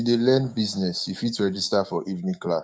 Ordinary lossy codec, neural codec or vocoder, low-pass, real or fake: none; codec, 16 kHz, 6 kbps, DAC; none; fake